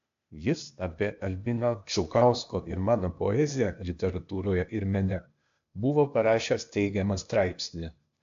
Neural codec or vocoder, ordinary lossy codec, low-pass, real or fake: codec, 16 kHz, 0.8 kbps, ZipCodec; AAC, 64 kbps; 7.2 kHz; fake